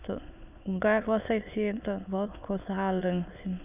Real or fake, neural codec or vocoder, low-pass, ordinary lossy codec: fake; autoencoder, 22.05 kHz, a latent of 192 numbers a frame, VITS, trained on many speakers; 3.6 kHz; none